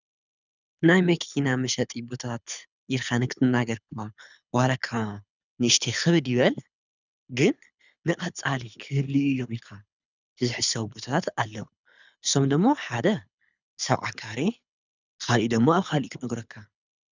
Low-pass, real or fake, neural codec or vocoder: 7.2 kHz; fake; codec, 24 kHz, 6 kbps, HILCodec